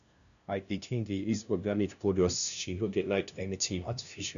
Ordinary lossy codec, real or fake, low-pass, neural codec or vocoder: none; fake; 7.2 kHz; codec, 16 kHz, 0.5 kbps, FunCodec, trained on LibriTTS, 25 frames a second